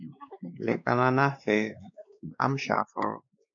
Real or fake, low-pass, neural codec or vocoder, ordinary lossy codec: fake; 7.2 kHz; codec, 16 kHz, 2 kbps, X-Codec, WavLM features, trained on Multilingual LibriSpeech; AAC, 64 kbps